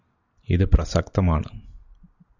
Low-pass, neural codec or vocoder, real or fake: 7.2 kHz; none; real